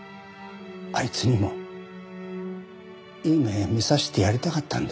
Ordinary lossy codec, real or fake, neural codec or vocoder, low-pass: none; real; none; none